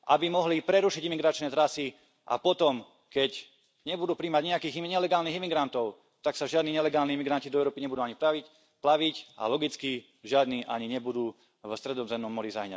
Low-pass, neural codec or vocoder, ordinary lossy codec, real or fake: none; none; none; real